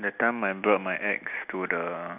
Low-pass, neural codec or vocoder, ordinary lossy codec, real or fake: 3.6 kHz; none; none; real